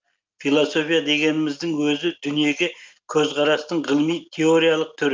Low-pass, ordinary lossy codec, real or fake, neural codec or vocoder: 7.2 kHz; Opus, 24 kbps; real; none